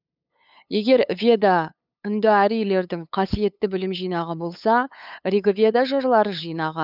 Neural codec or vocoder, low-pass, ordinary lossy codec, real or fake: codec, 16 kHz, 8 kbps, FunCodec, trained on LibriTTS, 25 frames a second; 5.4 kHz; none; fake